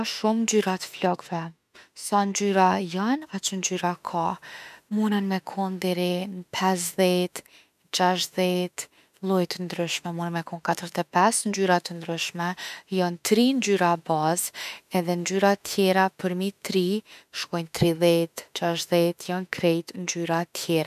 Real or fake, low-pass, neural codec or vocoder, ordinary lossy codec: fake; 14.4 kHz; autoencoder, 48 kHz, 32 numbers a frame, DAC-VAE, trained on Japanese speech; none